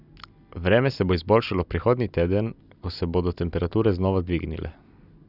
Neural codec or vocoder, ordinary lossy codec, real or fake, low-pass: none; none; real; 5.4 kHz